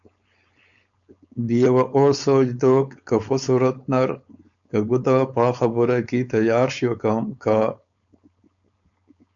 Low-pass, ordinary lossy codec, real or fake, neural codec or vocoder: 7.2 kHz; MP3, 96 kbps; fake; codec, 16 kHz, 4.8 kbps, FACodec